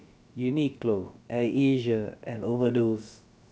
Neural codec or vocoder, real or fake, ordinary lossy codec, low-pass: codec, 16 kHz, about 1 kbps, DyCAST, with the encoder's durations; fake; none; none